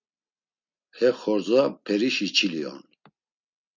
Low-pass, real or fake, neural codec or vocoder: 7.2 kHz; real; none